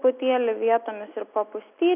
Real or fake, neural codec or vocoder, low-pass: real; none; 3.6 kHz